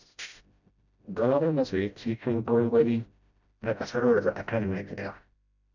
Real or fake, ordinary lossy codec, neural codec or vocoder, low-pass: fake; none; codec, 16 kHz, 0.5 kbps, FreqCodec, smaller model; 7.2 kHz